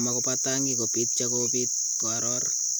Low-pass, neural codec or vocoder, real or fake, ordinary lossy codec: none; none; real; none